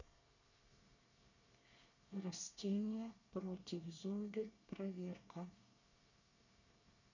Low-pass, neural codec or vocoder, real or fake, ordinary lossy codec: 7.2 kHz; codec, 24 kHz, 1 kbps, SNAC; fake; none